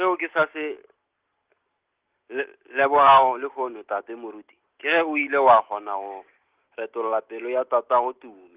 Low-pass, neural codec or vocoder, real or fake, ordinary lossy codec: 3.6 kHz; none; real; Opus, 16 kbps